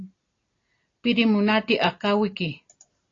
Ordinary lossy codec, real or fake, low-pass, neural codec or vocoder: AAC, 32 kbps; real; 7.2 kHz; none